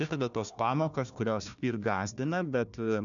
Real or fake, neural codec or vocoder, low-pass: fake; codec, 16 kHz, 1 kbps, FunCodec, trained on Chinese and English, 50 frames a second; 7.2 kHz